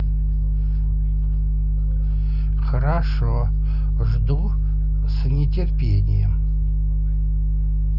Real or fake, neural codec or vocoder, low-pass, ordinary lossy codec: real; none; 5.4 kHz; none